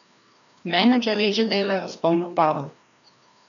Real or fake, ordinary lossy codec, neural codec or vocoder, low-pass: fake; MP3, 96 kbps; codec, 16 kHz, 1 kbps, FreqCodec, larger model; 7.2 kHz